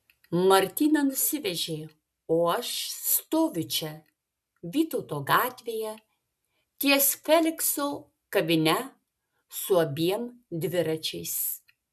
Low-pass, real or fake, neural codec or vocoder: 14.4 kHz; real; none